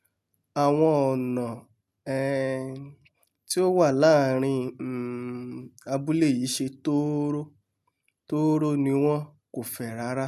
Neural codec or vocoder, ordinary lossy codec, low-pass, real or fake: none; none; 14.4 kHz; real